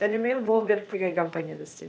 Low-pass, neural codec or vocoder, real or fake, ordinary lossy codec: none; codec, 16 kHz, 0.8 kbps, ZipCodec; fake; none